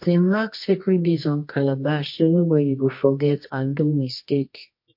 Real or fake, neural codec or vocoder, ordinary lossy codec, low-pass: fake; codec, 24 kHz, 0.9 kbps, WavTokenizer, medium music audio release; MP3, 32 kbps; 5.4 kHz